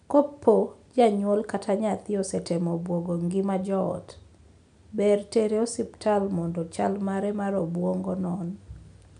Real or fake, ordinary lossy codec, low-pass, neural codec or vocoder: real; none; 9.9 kHz; none